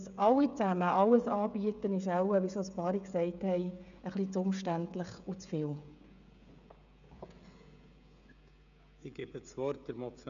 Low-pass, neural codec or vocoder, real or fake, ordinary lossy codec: 7.2 kHz; codec, 16 kHz, 16 kbps, FreqCodec, smaller model; fake; none